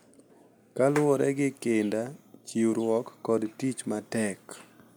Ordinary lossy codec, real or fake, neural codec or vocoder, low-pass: none; real; none; none